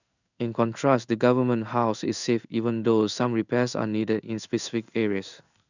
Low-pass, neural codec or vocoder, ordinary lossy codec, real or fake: 7.2 kHz; codec, 16 kHz in and 24 kHz out, 1 kbps, XY-Tokenizer; none; fake